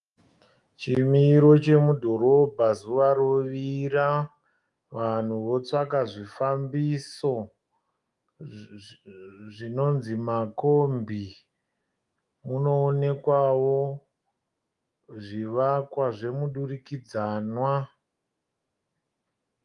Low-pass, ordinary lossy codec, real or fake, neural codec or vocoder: 10.8 kHz; Opus, 32 kbps; fake; autoencoder, 48 kHz, 128 numbers a frame, DAC-VAE, trained on Japanese speech